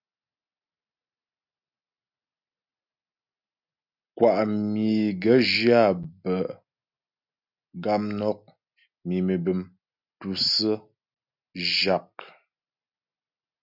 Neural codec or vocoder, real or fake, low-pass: none; real; 5.4 kHz